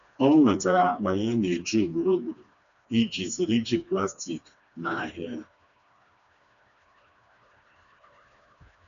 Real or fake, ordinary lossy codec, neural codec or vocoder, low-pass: fake; none; codec, 16 kHz, 2 kbps, FreqCodec, smaller model; 7.2 kHz